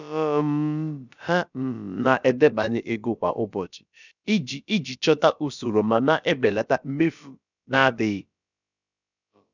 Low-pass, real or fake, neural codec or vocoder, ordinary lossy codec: 7.2 kHz; fake; codec, 16 kHz, about 1 kbps, DyCAST, with the encoder's durations; none